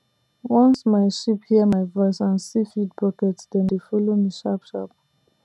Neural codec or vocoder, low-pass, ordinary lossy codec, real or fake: none; none; none; real